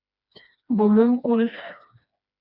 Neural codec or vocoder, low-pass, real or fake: codec, 16 kHz, 2 kbps, FreqCodec, smaller model; 5.4 kHz; fake